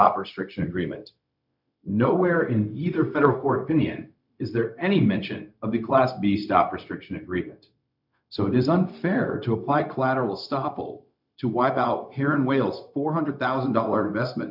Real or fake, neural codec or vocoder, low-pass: fake; codec, 16 kHz, 0.4 kbps, LongCat-Audio-Codec; 5.4 kHz